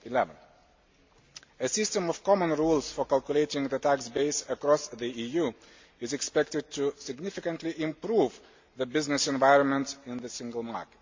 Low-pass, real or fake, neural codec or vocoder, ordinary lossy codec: 7.2 kHz; real; none; MP3, 48 kbps